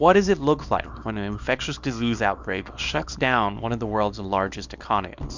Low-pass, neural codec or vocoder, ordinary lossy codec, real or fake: 7.2 kHz; codec, 24 kHz, 0.9 kbps, WavTokenizer, small release; MP3, 64 kbps; fake